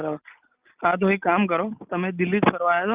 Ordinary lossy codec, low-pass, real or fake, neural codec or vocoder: Opus, 24 kbps; 3.6 kHz; real; none